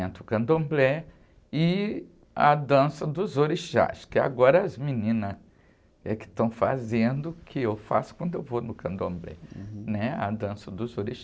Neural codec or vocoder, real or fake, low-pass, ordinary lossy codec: none; real; none; none